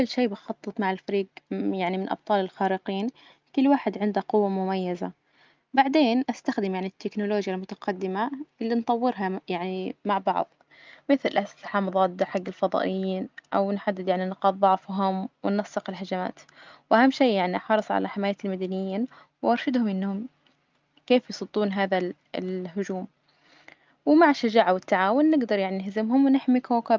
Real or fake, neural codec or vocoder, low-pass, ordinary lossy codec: real; none; 7.2 kHz; Opus, 24 kbps